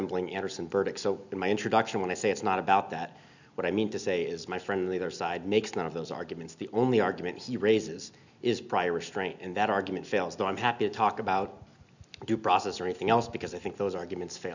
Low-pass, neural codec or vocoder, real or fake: 7.2 kHz; none; real